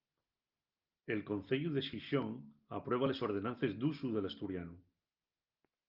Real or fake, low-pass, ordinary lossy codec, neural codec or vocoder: real; 5.4 kHz; Opus, 24 kbps; none